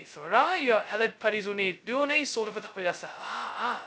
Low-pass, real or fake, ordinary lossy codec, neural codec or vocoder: none; fake; none; codec, 16 kHz, 0.2 kbps, FocalCodec